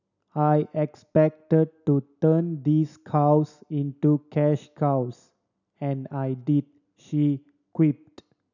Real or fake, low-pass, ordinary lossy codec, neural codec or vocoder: real; 7.2 kHz; none; none